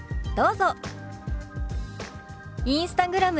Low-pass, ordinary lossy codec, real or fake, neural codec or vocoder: none; none; real; none